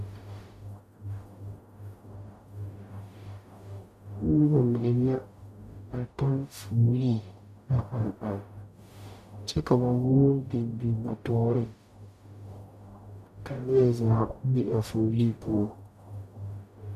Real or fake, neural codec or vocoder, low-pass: fake; codec, 44.1 kHz, 0.9 kbps, DAC; 14.4 kHz